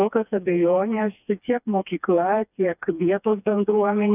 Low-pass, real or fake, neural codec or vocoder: 3.6 kHz; fake; codec, 16 kHz, 2 kbps, FreqCodec, smaller model